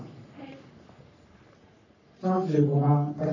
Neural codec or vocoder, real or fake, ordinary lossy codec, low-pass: codec, 44.1 kHz, 3.4 kbps, Pupu-Codec; fake; none; 7.2 kHz